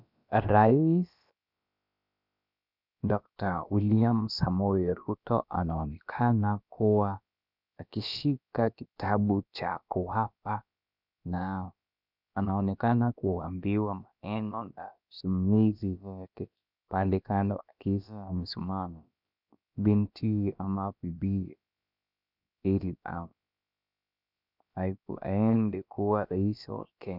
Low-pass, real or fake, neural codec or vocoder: 5.4 kHz; fake; codec, 16 kHz, about 1 kbps, DyCAST, with the encoder's durations